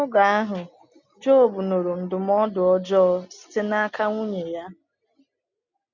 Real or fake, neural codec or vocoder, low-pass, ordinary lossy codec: real; none; 7.2 kHz; none